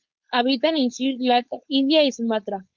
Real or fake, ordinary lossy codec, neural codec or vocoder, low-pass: fake; Opus, 64 kbps; codec, 16 kHz, 4.8 kbps, FACodec; 7.2 kHz